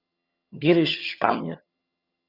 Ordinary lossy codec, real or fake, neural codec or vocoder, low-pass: Opus, 64 kbps; fake; vocoder, 22.05 kHz, 80 mel bands, HiFi-GAN; 5.4 kHz